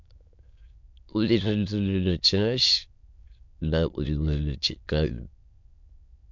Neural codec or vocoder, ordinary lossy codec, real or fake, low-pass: autoencoder, 22.05 kHz, a latent of 192 numbers a frame, VITS, trained on many speakers; MP3, 64 kbps; fake; 7.2 kHz